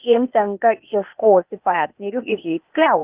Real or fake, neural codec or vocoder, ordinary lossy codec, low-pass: fake; codec, 16 kHz, 0.8 kbps, ZipCodec; Opus, 24 kbps; 3.6 kHz